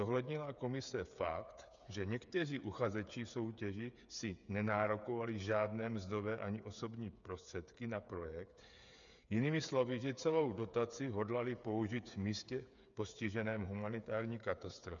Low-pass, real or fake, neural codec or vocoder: 7.2 kHz; fake; codec, 16 kHz, 8 kbps, FreqCodec, smaller model